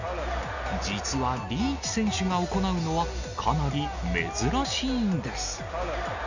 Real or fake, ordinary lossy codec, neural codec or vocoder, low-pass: real; none; none; 7.2 kHz